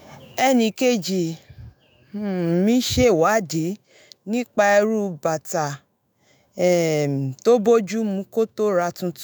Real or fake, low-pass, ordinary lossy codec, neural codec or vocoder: fake; none; none; autoencoder, 48 kHz, 128 numbers a frame, DAC-VAE, trained on Japanese speech